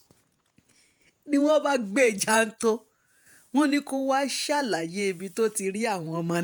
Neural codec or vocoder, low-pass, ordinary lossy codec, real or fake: vocoder, 48 kHz, 128 mel bands, Vocos; none; none; fake